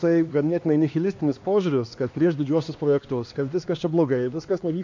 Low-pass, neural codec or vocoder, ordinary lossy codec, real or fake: 7.2 kHz; codec, 16 kHz, 2 kbps, X-Codec, HuBERT features, trained on LibriSpeech; AAC, 48 kbps; fake